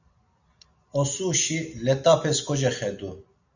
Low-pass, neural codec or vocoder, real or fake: 7.2 kHz; none; real